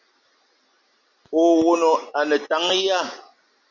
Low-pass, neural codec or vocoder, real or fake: 7.2 kHz; none; real